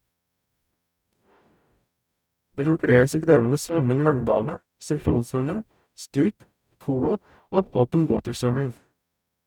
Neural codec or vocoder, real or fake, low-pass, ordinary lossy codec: codec, 44.1 kHz, 0.9 kbps, DAC; fake; 19.8 kHz; none